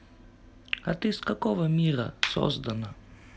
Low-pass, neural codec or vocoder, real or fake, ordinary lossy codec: none; none; real; none